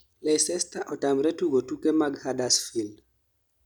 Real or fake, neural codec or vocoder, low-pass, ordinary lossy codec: real; none; none; none